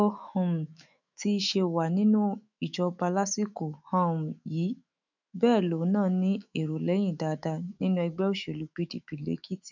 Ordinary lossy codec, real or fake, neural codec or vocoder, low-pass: none; fake; autoencoder, 48 kHz, 128 numbers a frame, DAC-VAE, trained on Japanese speech; 7.2 kHz